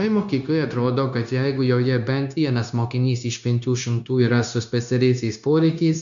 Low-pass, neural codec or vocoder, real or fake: 7.2 kHz; codec, 16 kHz, 0.9 kbps, LongCat-Audio-Codec; fake